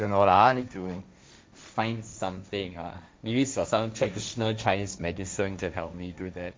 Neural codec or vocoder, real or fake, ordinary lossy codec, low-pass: codec, 16 kHz, 1.1 kbps, Voila-Tokenizer; fake; none; none